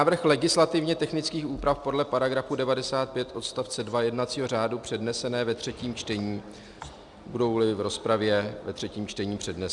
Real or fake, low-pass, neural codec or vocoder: real; 10.8 kHz; none